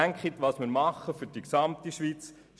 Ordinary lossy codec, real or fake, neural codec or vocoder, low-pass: none; real; none; none